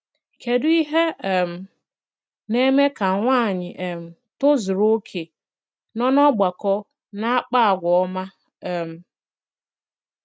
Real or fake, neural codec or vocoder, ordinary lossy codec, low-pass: real; none; none; none